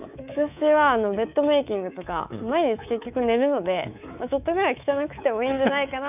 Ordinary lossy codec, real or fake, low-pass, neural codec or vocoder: none; fake; 3.6 kHz; codec, 24 kHz, 3.1 kbps, DualCodec